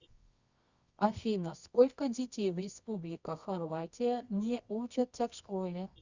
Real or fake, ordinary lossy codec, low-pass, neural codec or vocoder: fake; Opus, 64 kbps; 7.2 kHz; codec, 24 kHz, 0.9 kbps, WavTokenizer, medium music audio release